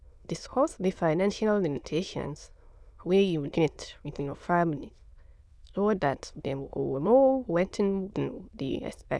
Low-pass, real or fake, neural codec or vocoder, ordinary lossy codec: none; fake; autoencoder, 22.05 kHz, a latent of 192 numbers a frame, VITS, trained on many speakers; none